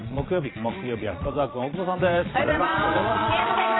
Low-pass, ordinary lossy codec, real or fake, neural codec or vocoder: 7.2 kHz; AAC, 16 kbps; fake; vocoder, 22.05 kHz, 80 mel bands, Vocos